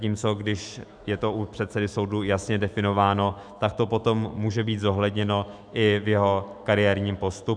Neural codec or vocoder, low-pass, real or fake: autoencoder, 48 kHz, 128 numbers a frame, DAC-VAE, trained on Japanese speech; 9.9 kHz; fake